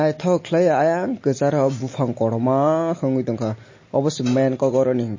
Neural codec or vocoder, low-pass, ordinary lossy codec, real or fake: none; 7.2 kHz; MP3, 32 kbps; real